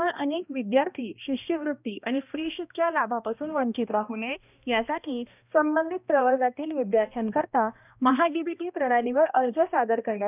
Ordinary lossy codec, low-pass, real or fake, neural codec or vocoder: none; 3.6 kHz; fake; codec, 16 kHz, 1 kbps, X-Codec, HuBERT features, trained on balanced general audio